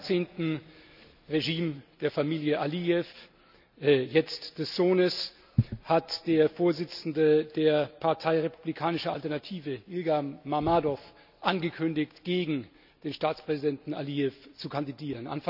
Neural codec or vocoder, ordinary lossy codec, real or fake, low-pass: none; none; real; 5.4 kHz